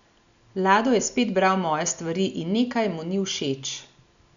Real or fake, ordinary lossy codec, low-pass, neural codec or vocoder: real; none; 7.2 kHz; none